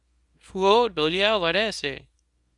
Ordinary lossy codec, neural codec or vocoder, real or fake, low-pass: Opus, 64 kbps; codec, 24 kHz, 0.9 kbps, WavTokenizer, small release; fake; 10.8 kHz